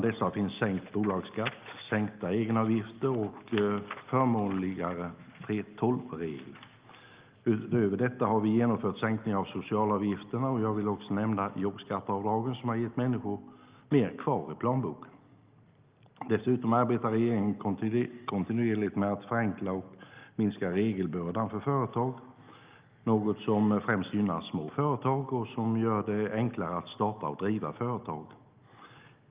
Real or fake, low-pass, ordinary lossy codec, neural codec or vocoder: real; 3.6 kHz; Opus, 32 kbps; none